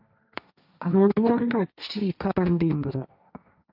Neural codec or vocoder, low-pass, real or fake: codec, 16 kHz, 1.1 kbps, Voila-Tokenizer; 5.4 kHz; fake